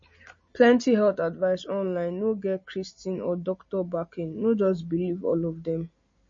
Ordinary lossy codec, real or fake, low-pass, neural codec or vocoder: MP3, 32 kbps; real; 7.2 kHz; none